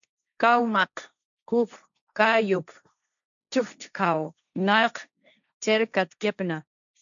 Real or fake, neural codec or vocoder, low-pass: fake; codec, 16 kHz, 1.1 kbps, Voila-Tokenizer; 7.2 kHz